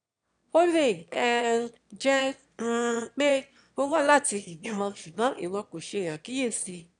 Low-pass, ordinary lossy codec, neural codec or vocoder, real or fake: 9.9 kHz; none; autoencoder, 22.05 kHz, a latent of 192 numbers a frame, VITS, trained on one speaker; fake